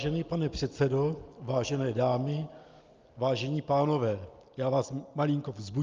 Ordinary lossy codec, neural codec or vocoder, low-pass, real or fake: Opus, 24 kbps; none; 7.2 kHz; real